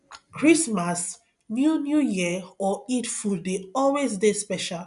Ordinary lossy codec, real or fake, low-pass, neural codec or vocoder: none; real; 10.8 kHz; none